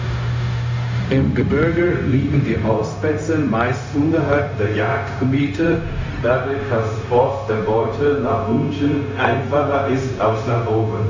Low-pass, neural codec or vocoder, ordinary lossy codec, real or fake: 7.2 kHz; codec, 16 kHz, 0.4 kbps, LongCat-Audio-Codec; MP3, 64 kbps; fake